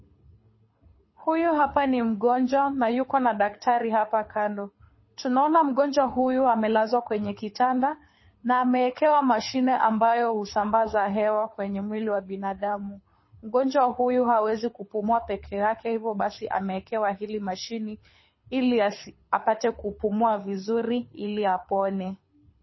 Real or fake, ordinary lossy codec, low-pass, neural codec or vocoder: fake; MP3, 24 kbps; 7.2 kHz; codec, 24 kHz, 6 kbps, HILCodec